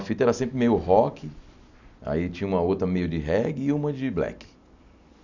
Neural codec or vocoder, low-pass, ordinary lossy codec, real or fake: none; 7.2 kHz; none; real